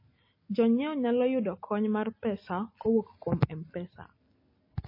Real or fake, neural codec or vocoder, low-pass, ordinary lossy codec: real; none; 5.4 kHz; MP3, 24 kbps